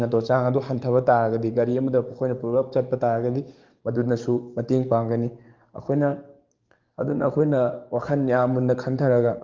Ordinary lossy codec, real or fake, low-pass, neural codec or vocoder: Opus, 32 kbps; fake; 7.2 kHz; codec, 44.1 kHz, 7.8 kbps, DAC